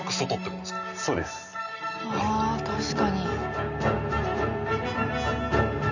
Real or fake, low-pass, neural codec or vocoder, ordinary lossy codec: real; 7.2 kHz; none; none